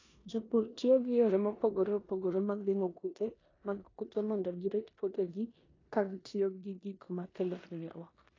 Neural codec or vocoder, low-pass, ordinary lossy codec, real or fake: codec, 16 kHz in and 24 kHz out, 0.9 kbps, LongCat-Audio-Codec, four codebook decoder; 7.2 kHz; AAC, 32 kbps; fake